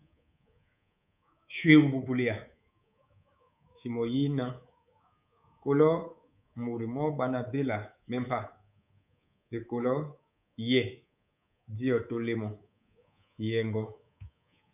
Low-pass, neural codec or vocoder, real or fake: 3.6 kHz; codec, 24 kHz, 3.1 kbps, DualCodec; fake